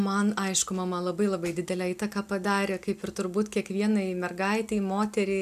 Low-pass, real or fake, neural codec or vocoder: 14.4 kHz; real; none